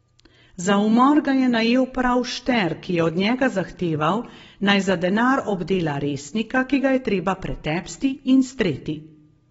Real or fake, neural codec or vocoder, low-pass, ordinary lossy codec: real; none; 19.8 kHz; AAC, 24 kbps